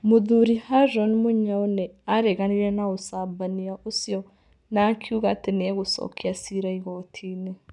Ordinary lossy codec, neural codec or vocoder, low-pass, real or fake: none; none; 10.8 kHz; real